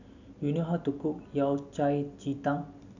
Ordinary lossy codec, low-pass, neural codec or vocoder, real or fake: none; 7.2 kHz; none; real